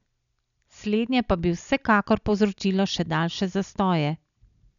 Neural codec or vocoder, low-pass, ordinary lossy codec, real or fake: none; 7.2 kHz; none; real